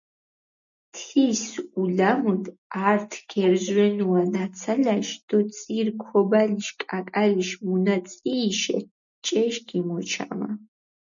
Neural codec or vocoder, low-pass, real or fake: none; 7.2 kHz; real